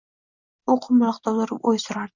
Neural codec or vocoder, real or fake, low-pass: none; real; 7.2 kHz